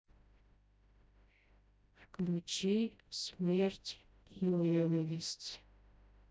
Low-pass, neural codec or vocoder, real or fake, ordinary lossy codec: none; codec, 16 kHz, 0.5 kbps, FreqCodec, smaller model; fake; none